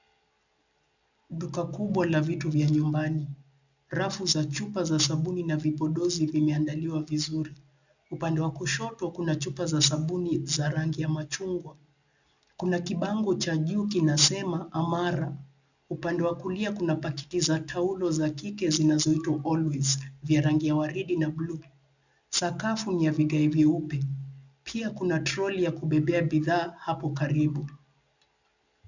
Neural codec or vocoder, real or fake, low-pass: none; real; 7.2 kHz